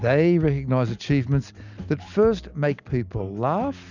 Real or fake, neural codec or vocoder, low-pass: real; none; 7.2 kHz